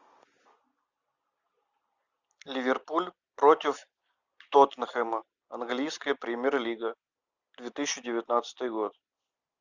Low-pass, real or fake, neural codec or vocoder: 7.2 kHz; real; none